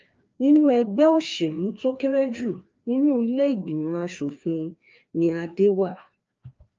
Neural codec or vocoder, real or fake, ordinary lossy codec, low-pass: codec, 16 kHz, 2 kbps, FreqCodec, larger model; fake; Opus, 24 kbps; 7.2 kHz